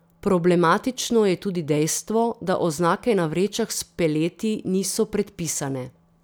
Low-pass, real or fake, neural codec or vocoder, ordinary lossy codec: none; real; none; none